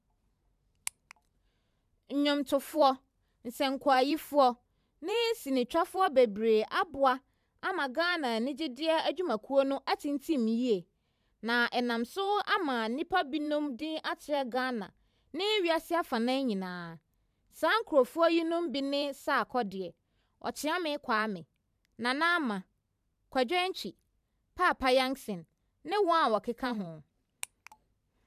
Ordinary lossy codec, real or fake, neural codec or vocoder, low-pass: none; fake; vocoder, 44.1 kHz, 128 mel bands every 512 samples, BigVGAN v2; 14.4 kHz